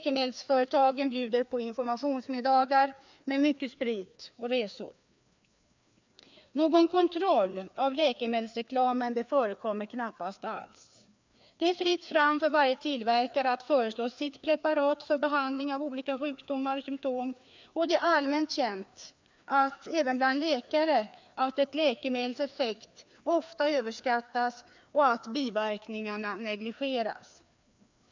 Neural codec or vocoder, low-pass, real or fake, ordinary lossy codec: codec, 16 kHz, 2 kbps, FreqCodec, larger model; 7.2 kHz; fake; MP3, 64 kbps